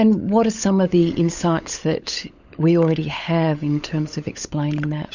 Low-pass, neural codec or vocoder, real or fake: 7.2 kHz; codec, 16 kHz, 16 kbps, FunCodec, trained on LibriTTS, 50 frames a second; fake